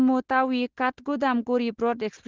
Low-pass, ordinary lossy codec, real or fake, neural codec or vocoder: 7.2 kHz; Opus, 16 kbps; real; none